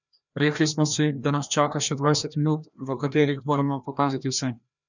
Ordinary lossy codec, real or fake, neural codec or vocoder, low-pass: none; fake; codec, 16 kHz, 1 kbps, FreqCodec, larger model; 7.2 kHz